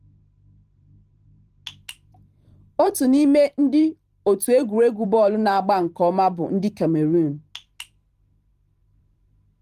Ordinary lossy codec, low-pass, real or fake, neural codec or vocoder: Opus, 24 kbps; 14.4 kHz; fake; vocoder, 44.1 kHz, 128 mel bands every 512 samples, BigVGAN v2